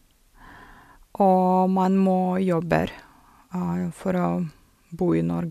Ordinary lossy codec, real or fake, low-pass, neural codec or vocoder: MP3, 96 kbps; real; 14.4 kHz; none